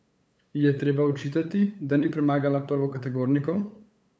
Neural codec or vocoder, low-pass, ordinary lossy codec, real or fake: codec, 16 kHz, 8 kbps, FunCodec, trained on LibriTTS, 25 frames a second; none; none; fake